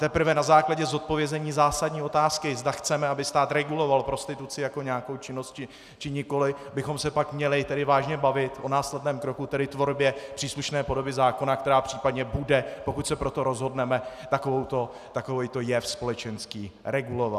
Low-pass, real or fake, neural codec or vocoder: 14.4 kHz; real; none